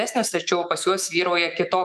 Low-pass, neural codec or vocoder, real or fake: 14.4 kHz; autoencoder, 48 kHz, 128 numbers a frame, DAC-VAE, trained on Japanese speech; fake